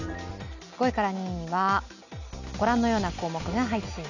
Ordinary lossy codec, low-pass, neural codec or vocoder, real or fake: none; 7.2 kHz; none; real